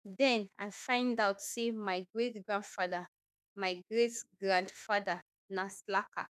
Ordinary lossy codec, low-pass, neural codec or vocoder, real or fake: none; 14.4 kHz; autoencoder, 48 kHz, 32 numbers a frame, DAC-VAE, trained on Japanese speech; fake